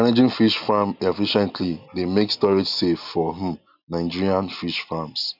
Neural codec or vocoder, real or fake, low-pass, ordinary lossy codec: none; real; 5.4 kHz; none